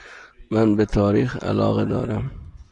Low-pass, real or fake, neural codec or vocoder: 10.8 kHz; real; none